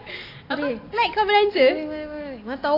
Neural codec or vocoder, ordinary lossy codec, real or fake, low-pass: none; AAC, 32 kbps; real; 5.4 kHz